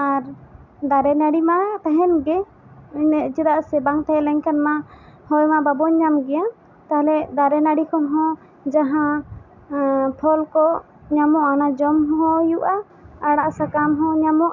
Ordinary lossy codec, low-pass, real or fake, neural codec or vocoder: none; 7.2 kHz; real; none